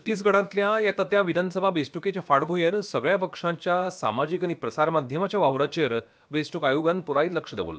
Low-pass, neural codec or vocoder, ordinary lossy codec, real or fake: none; codec, 16 kHz, about 1 kbps, DyCAST, with the encoder's durations; none; fake